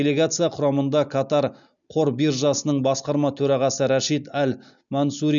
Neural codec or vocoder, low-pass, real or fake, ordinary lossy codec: none; 7.2 kHz; real; none